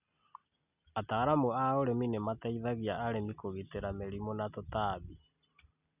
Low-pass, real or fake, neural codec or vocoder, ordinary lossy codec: 3.6 kHz; real; none; none